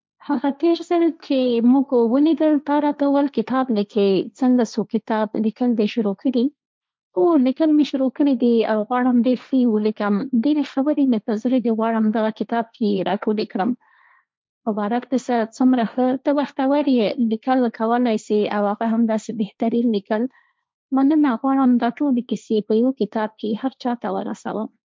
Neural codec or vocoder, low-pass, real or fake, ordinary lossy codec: codec, 16 kHz, 1.1 kbps, Voila-Tokenizer; none; fake; none